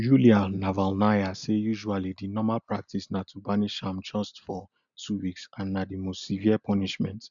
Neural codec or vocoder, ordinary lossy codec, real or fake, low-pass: none; none; real; 7.2 kHz